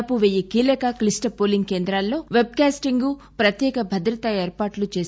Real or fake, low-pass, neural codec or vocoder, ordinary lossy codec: real; none; none; none